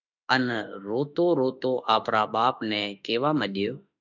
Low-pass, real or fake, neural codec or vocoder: 7.2 kHz; fake; autoencoder, 48 kHz, 32 numbers a frame, DAC-VAE, trained on Japanese speech